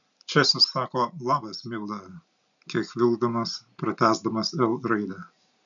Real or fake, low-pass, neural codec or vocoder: real; 7.2 kHz; none